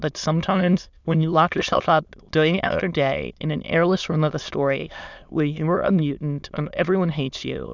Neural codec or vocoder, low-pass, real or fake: autoencoder, 22.05 kHz, a latent of 192 numbers a frame, VITS, trained on many speakers; 7.2 kHz; fake